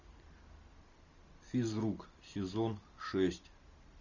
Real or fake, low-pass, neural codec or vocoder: real; 7.2 kHz; none